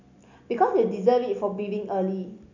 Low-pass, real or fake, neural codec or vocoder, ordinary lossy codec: 7.2 kHz; real; none; none